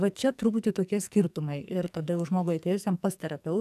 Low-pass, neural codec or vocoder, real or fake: 14.4 kHz; codec, 44.1 kHz, 2.6 kbps, SNAC; fake